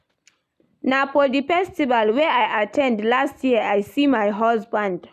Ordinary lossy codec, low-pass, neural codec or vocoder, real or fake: none; 14.4 kHz; none; real